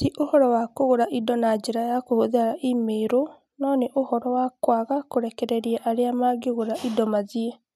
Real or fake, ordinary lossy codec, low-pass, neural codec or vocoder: real; none; 14.4 kHz; none